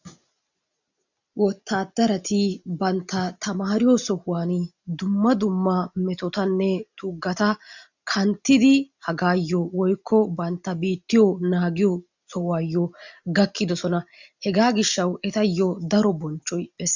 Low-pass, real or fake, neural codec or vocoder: 7.2 kHz; real; none